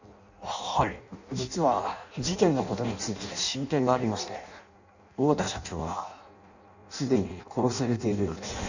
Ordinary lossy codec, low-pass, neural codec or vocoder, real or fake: none; 7.2 kHz; codec, 16 kHz in and 24 kHz out, 0.6 kbps, FireRedTTS-2 codec; fake